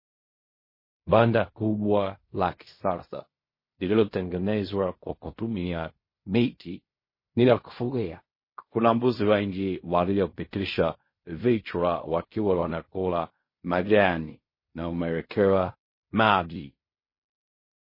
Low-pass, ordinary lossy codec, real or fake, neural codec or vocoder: 5.4 kHz; MP3, 24 kbps; fake; codec, 16 kHz in and 24 kHz out, 0.4 kbps, LongCat-Audio-Codec, fine tuned four codebook decoder